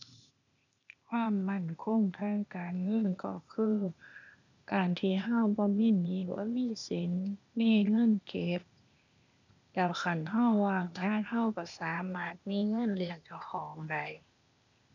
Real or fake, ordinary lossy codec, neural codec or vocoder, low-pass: fake; none; codec, 16 kHz, 0.8 kbps, ZipCodec; 7.2 kHz